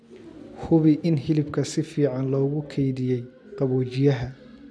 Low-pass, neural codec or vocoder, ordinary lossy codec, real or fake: none; none; none; real